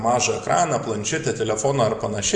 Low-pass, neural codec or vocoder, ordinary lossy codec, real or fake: 10.8 kHz; none; Opus, 64 kbps; real